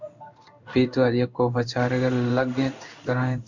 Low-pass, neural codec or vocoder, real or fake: 7.2 kHz; codec, 16 kHz in and 24 kHz out, 1 kbps, XY-Tokenizer; fake